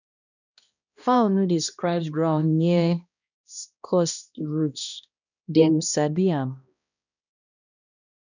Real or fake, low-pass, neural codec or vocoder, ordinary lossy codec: fake; 7.2 kHz; codec, 16 kHz, 1 kbps, X-Codec, HuBERT features, trained on balanced general audio; none